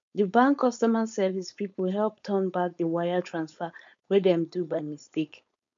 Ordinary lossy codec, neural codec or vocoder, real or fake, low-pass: MP3, 64 kbps; codec, 16 kHz, 4.8 kbps, FACodec; fake; 7.2 kHz